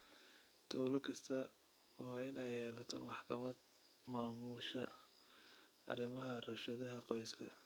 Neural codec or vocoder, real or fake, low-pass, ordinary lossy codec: codec, 44.1 kHz, 2.6 kbps, SNAC; fake; none; none